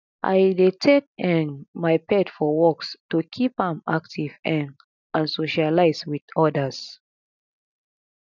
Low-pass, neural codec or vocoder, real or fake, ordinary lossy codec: 7.2 kHz; none; real; none